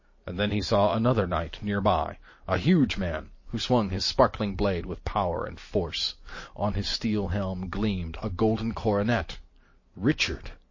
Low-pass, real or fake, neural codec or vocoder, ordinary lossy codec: 7.2 kHz; real; none; MP3, 32 kbps